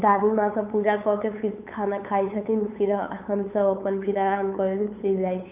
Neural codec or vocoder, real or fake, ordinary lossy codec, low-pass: codec, 16 kHz, 8 kbps, FunCodec, trained on LibriTTS, 25 frames a second; fake; none; 3.6 kHz